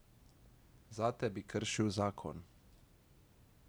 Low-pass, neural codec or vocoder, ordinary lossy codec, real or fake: none; none; none; real